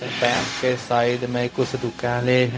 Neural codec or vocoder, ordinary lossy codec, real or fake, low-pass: codec, 16 kHz, 0.4 kbps, LongCat-Audio-Codec; none; fake; none